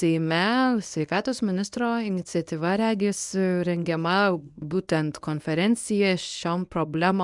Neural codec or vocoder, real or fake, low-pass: codec, 24 kHz, 0.9 kbps, WavTokenizer, medium speech release version 1; fake; 10.8 kHz